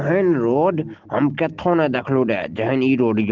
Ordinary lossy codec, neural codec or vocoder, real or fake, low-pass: Opus, 16 kbps; none; real; 7.2 kHz